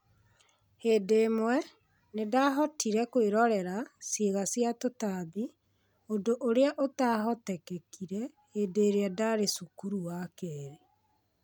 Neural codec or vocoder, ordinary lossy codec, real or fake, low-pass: none; none; real; none